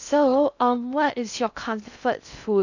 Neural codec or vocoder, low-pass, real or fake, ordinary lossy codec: codec, 16 kHz in and 24 kHz out, 0.6 kbps, FocalCodec, streaming, 2048 codes; 7.2 kHz; fake; none